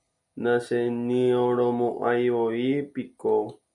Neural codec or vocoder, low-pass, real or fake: none; 10.8 kHz; real